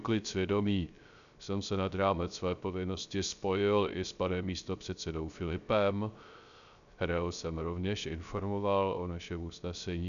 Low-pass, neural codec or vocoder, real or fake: 7.2 kHz; codec, 16 kHz, 0.3 kbps, FocalCodec; fake